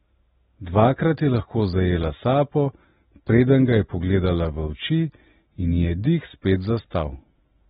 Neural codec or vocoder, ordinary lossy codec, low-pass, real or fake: none; AAC, 16 kbps; 19.8 kHz; real